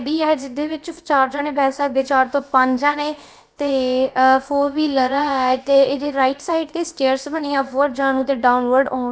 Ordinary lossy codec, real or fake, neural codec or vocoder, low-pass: none; fake; codec, 16 kHz, about 1 kbps, DyCAST, with the encoder's durations; none